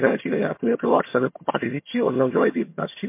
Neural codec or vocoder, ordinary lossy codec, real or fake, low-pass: vocoder, 22.05 kHz, 80 mel bands, HiFi-GAN; MP3, 24 kbps; fake; 3.6 kHz